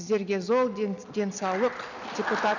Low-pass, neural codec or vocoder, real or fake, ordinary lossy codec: 7.2 kHz; none; real; none